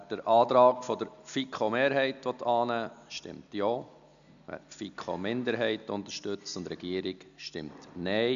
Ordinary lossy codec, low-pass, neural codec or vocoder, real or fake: none; 7.2 kHz; none; real